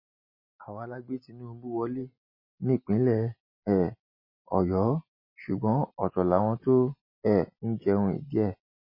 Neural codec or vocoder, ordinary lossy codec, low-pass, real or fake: none; MP3, 24 kbps; 5.4 kHz; real